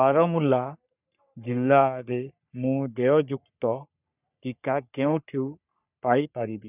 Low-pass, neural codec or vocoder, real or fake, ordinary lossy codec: 3.6 kHz; codec, 44.1 kHz, 3.4 kbps, Pupu-Codec; fake; none